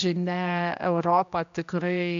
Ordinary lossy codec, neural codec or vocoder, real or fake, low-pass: MP3, 64 kbps; codec, 16 kHz, 1 kbps, X-Codec, HuBERT features, trained on general audio; fake; 7.2 kHz